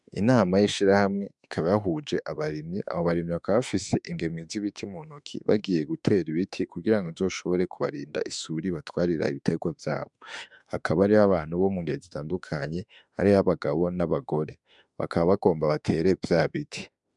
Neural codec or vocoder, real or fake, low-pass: autoencoder, 48 kHz, 32 numbers a frame, DAC-VAE, trained on Japanese speech; fake; 10.8 kHz